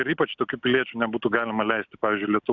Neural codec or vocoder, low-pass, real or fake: none; 7.2 kHz; real